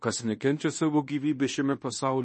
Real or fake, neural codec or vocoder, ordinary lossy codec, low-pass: fake; codec, 16 kHz in and 24 kHz out, 0.9 kbps, LongCat-Audio-Codec, fine tuned four codebook decoder; MP3, 32 kbps; 10.8 kHz